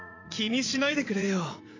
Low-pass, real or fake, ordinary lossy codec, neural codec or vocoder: 7.2 kHz; fake; AAC, 32 kbps; vocoder, 44.1 kHz, 80 mel bands, Vocos